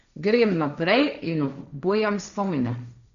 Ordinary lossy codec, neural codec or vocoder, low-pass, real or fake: none; codec, 16 kHz, 1.1 kbps, Voila-Tokenizer; 7.2 kHz; fake